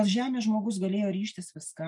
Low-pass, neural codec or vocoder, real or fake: 10.8 kHz; none; real